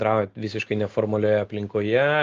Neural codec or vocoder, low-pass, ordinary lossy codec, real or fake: none; 7.2 kHz; Opus, 32 kbps; real